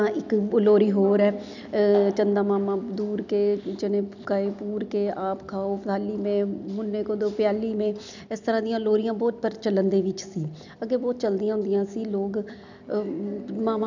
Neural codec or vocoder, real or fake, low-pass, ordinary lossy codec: none; real; 7.2 kHz; none